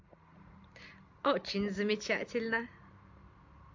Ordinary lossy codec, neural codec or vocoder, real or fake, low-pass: MP3, 48 kbps; none; real; 7.2 kHz